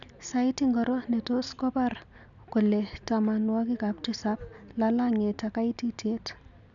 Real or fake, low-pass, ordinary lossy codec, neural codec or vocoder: real; 7.2 kHz; none; none